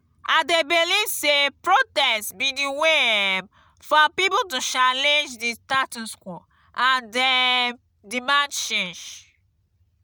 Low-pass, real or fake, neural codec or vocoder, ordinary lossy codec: none; real; none; none